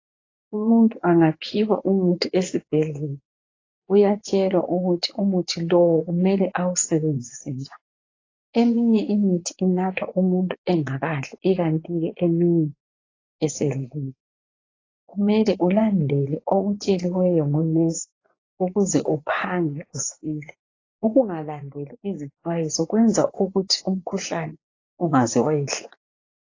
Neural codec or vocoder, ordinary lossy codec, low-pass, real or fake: vocoder, 24 kHz, 100 mel bands, Vocos; AAC, 32 kbps; 7.2 kHz; fake